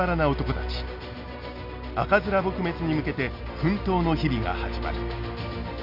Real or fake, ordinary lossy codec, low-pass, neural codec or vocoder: real; none; 5.4 kHz; none